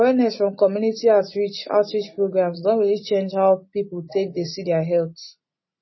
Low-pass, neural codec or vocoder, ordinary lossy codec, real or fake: 7.2 kHz; none; MP3, 24 kbps; real